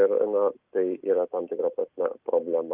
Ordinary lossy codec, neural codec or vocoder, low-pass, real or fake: Opus, 32 kbps; none; 3.6 kHz; real